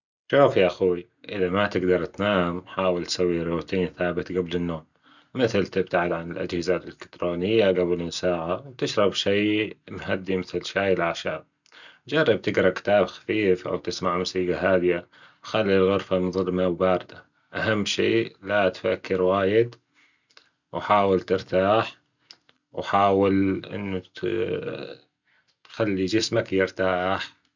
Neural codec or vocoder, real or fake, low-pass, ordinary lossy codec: none; real; 7.2 kHz; none